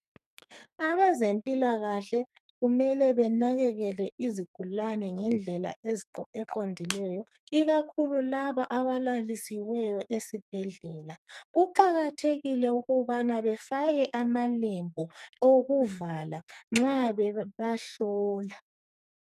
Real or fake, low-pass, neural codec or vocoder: fake; 14.4 kHz; codec, 44.1 kHz, 2.6 kbps, SNAC